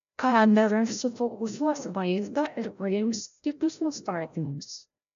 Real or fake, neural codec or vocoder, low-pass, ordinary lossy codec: fake; codec, 16 kHz, 0.5 kbps, FreqCodec, larger model; 7.2 kHz; AAC, 64 kbps